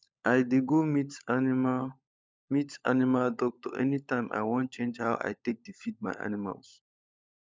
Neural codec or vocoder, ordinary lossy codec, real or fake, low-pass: codec, 16 kHz, 16 kbps, FunCodec, trained on LibriTTS, 50 frames a second; none; fake; none